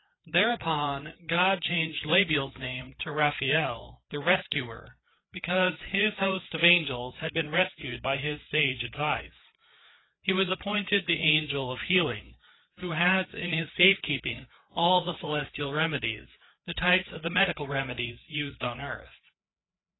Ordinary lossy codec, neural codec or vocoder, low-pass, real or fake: AAC, 16 kbps; codec, 16 kHz, 4 kbps, FreqCodec, larger model; 7.2 kHz; fake